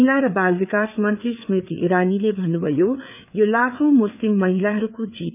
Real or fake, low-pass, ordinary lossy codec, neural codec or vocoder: fake; 3.6 kHz; none; codec, 16 kHz, 4 kbps, FreqCodec, larger model